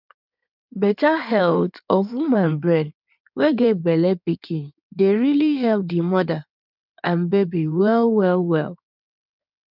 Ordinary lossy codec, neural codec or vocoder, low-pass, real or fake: none; codec, 16 kHz in and 24 kHz out, 2.2 kbps, FireRedTTS-2 codec; 5.4 kHz; fake